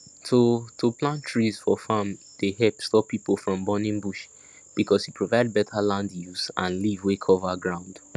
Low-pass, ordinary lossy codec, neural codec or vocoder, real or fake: none; none; none; real